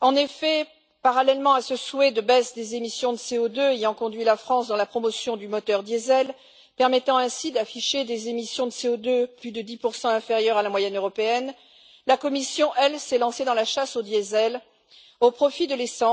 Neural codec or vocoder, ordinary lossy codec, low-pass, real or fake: none; none; none; real